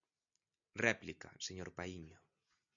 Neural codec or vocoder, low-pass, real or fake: none; 7.2 kHz; real